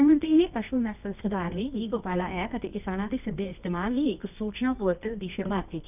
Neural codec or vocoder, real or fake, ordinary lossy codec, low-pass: codec, 24 kHz, 0.9 kbps, WavTokenizer, medium music audio release; fake; none; 3.6 kHz